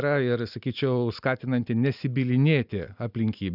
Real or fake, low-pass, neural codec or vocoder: real; 5.4 kHz; none